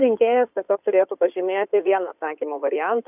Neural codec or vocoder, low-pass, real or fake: codec, 16 kHz in and 24 kHz out, 2.2 kbps, FireRedTTS-2 codec; 3.6 kHz; fake